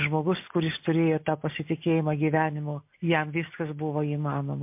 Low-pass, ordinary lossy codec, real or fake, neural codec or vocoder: 3.6 kHz; MP3, 32 kbps; real; none